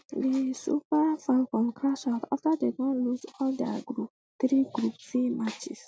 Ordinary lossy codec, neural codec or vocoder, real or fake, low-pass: none; none; real; none